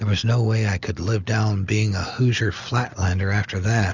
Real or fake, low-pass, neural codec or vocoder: real; 7.2 kHz; none